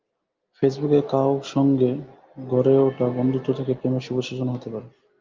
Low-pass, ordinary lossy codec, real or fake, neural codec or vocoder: 7.2 kHz; Opus, 24 kbps; real; none